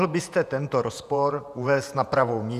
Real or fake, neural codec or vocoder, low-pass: fake; vocoder, 48 kHz, 128 mel bands, Vocos; 14.4 kHz